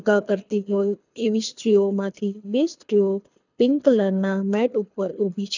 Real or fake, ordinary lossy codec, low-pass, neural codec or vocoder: fake; none; 7.2 kHz; codec, 24 kHz, 6 kbps, HILCodec